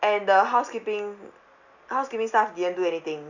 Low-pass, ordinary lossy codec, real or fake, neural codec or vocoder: 7.2 kHz; none; real; none